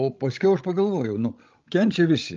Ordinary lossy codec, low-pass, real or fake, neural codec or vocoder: Opus, 24 kbps; 7.2 kHz; fake; codec, 16 kHz, 16 kbps, FreqCodec, larger model